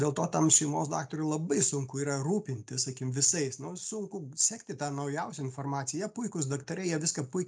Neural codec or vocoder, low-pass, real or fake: none; 9.9 kHz; real